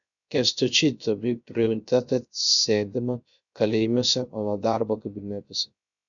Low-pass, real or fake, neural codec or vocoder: 7.2 kHz; fake; codec, 16 kHz, 0.3 kbps, FocalCodec